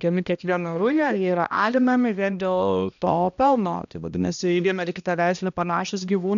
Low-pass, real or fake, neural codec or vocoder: 7.2 kHz; fake; codec, 16 kHz, 1 kbps, X-Codec, HuBERT features, trained on balanced general audio